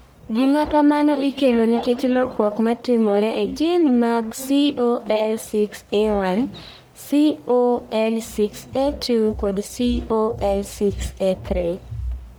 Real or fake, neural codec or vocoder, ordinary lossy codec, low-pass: fake; codec, 44.1 kHz, 1.7 kbps, Pupu-Codec; none; none